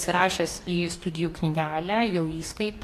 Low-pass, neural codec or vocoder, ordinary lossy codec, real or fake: 14.4 kHz; codec, 44.1 kHz, 2.6 kbps, DAC; AAC, 64 kbps; fake